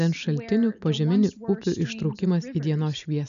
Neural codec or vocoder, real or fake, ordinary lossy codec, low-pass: none; real; MP3, 96 kbps; 7.2 kHz